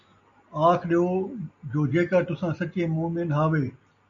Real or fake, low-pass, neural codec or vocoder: real; 7.2 kHz; none